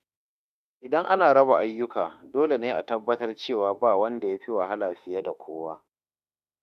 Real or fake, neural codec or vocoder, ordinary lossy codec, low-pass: fake; autoencoder, 48 kHz, 32 numbers a frame, DAC-VAE, trained on Japanese speech; none; 14.4 kHz